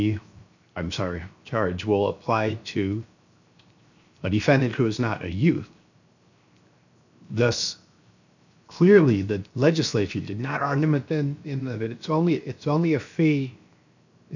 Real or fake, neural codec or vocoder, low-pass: fake; codec, 16 kHz, 0.7 kbps, FocalCodec; 7.2 kHz